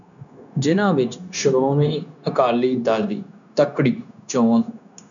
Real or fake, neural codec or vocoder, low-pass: fake; codec, 16 kHz, 0.9 kbps, LongCat-Audio-Codec; 7.2 kHz